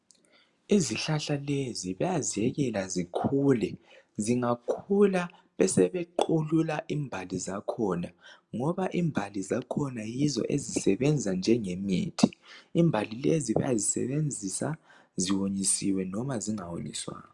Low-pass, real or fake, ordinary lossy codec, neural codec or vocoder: 10.8 kHz; real; Opus, 64 kbps; none